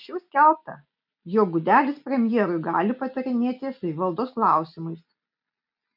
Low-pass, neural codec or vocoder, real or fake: 5.4 kHz; none; real